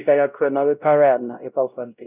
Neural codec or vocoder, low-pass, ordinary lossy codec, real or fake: codec, 16 kHz, 0.5 kbps, X-Codec, WavLM features, trained on Multilingual LibriSpeech; 3.6 kHz; none; fake